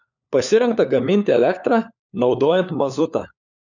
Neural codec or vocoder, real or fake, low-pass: codec, 16 kHz, 4 kbps, FunCodec, trained on LibriTTS, 50 frames a second; fake; 7.2 kHz